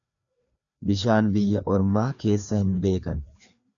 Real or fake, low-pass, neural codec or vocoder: fake; 7.2 kHz; codec, 16 kHz, 2 kbps, FreqCodec, larger model